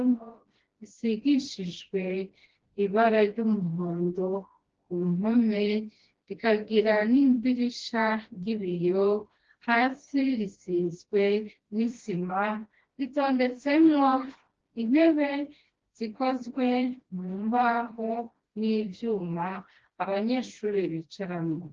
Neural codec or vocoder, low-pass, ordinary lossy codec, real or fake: codec, 16 kHz, 1 kbps, FreqCodec, smaller model; 7.2 kHz; Opus, 16 kbps; fake